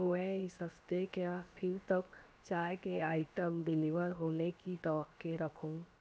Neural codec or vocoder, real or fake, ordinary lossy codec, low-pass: codec, 16 kHz, about 1 kbps, DyCAST, with the encoder's durations; fake; none; none